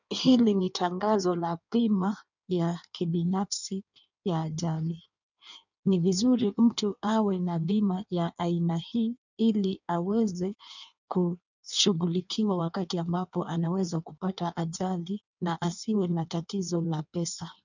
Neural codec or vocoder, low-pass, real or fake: codec, 16 kHz in and 24 kHz out, 1.1 kbps, FireRedTTS-2 codec; 7.2 kHz; fake